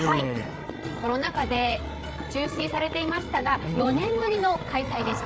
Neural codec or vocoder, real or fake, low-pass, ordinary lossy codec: codec, 16 kHz, 8 kbps, FreqCodec, larger model; fake; none; none